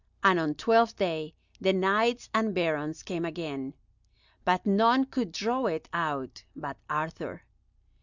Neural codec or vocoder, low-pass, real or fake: none; 7.2 kHz; real